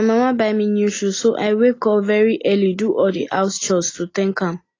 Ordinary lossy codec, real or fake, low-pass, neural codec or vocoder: AAC, 32 kbps; real; 7.2 kHz; none